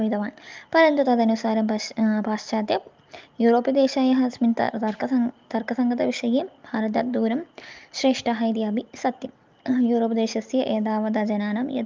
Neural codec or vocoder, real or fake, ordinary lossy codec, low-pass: none; real; Opus, 24 kbps; 7.2 kHz